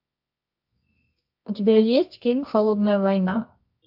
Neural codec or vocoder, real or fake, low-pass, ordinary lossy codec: codec, 24 kHz, 0.9 kbps, WavTokenizer, medium music audio release; fake; 5.4 kHz; AAC, 48 kbps